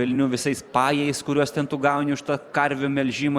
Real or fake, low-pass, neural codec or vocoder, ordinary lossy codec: fake; 19.8 kHz; vocoder, 44.1 kHz, 128 mel bands every 512 samples, BigVGAN v2; Opus, 64 kbps